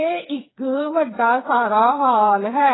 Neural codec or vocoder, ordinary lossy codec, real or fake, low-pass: codec, 16 kHz, 4 kbps, FreqCodec, smaller model; AAC, 16 kbps; fake; 7.2 kHz